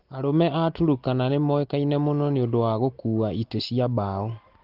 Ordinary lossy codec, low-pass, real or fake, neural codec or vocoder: Opus, 16 kbps; 5.4 kHz; real; none